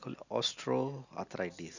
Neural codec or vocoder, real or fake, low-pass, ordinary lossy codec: none; real; 7.2 kHz; none